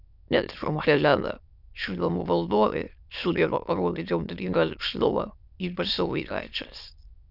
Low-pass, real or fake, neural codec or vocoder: 5.4 kHz; fake; autoencoder, 22.05 kHz, a latent of 192 numbers a frame, VITS, trained on many speakers